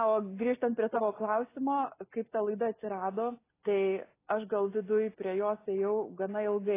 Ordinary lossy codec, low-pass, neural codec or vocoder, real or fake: AAC, 16 kbps; 3.6 kHz; none; real